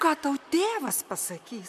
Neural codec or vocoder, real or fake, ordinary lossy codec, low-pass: vocoder, 44.1 kHz, 128 mel bands, Pupu-Vocoder; fake; MP3, 96 kbps; 14.4 kHz